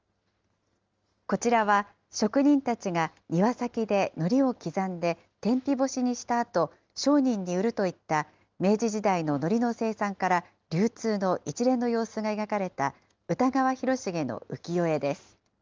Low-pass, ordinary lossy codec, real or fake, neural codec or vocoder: 7.2 kHz; Opus, 32 kbps; real; none